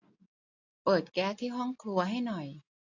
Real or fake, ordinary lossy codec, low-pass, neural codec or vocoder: real; AAC, 48 kbps; 7.2 kHz; none